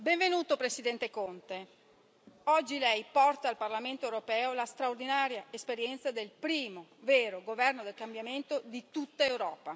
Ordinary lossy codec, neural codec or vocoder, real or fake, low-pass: none; none; real; none